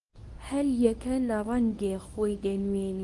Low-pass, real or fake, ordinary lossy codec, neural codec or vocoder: 10.8 kHz; fake; Opus, 24 kbps; codec, 24 kHz, 0.9 kbps, WavTokenizer, medium speech release version 1